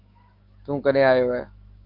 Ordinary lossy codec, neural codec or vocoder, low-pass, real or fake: Opus, 32 kbps; autoencoder, 48 kHz, 128 numbers a frame, DAC-VAE, trained on Japanese speech; 5.4 kHz; fake